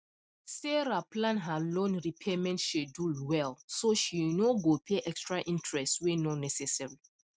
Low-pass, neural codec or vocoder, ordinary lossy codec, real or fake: none; none; none; real